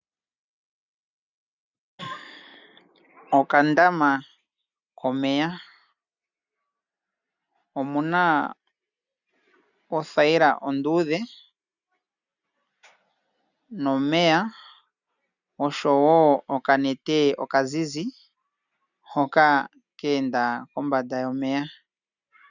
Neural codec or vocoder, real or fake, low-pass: none; real; 7.2 kHz